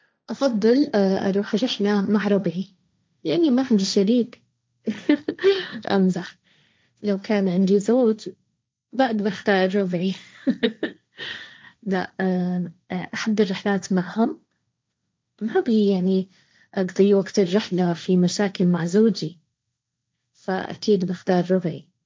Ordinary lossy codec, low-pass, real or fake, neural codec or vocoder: none; none; fake; codec, 16 kHz, 1.1 kbps, Voila-Tokenizer